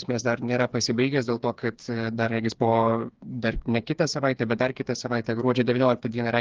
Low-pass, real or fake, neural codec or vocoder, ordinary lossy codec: 7.2 kHz; fake; codec, 16 kHz, 4 kbps, FreqCodec, smaller model; Opus, 32 kbps